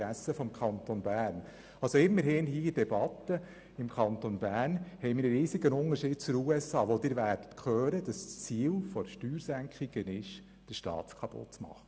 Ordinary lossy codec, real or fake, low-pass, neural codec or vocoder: none; real; none; none